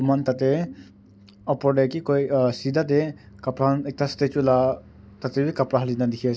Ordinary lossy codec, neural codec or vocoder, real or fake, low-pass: none; none; real; none